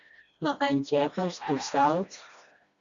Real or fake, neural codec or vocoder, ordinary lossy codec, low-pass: fake; codec, 16 kHz, 1 kbps, FreqCodec, smaller model; Opus, 64 kbps; 7.2 kHz